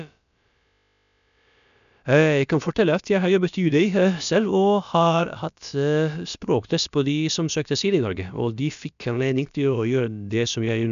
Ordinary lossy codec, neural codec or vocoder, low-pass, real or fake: none; codec, 16 kHz, about 1 kbps, DyCAST, with the encoder's durations; 7.2 kHz; fake